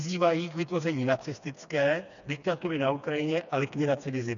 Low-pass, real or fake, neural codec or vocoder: 7.2 kHz; fake; codec, 16 kHz, 2 kbps, FreqCodec, smaller model